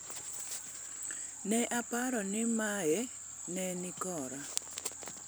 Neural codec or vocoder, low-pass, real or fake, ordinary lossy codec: vocoder, 44.1 kHz, 128 mel bands every 256 samples, BigVGAN v2; none; fake; none